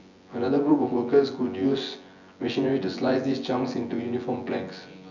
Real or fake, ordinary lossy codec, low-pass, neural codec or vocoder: fake; Opus, 64 kbps; 7.2 kHz; vocoder, 24 kHz, 100 mel bands, Vocos